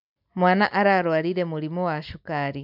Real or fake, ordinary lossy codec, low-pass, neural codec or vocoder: real; none; 5.4 kHz; none